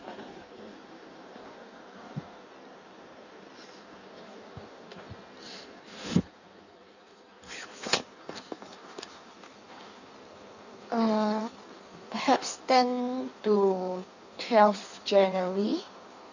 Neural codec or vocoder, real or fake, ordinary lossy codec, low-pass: codec, 16 kHz in and 24 kHz out, 1.1 kbps, FireRedTTS-2 codec; fake; none; 7.2 kHz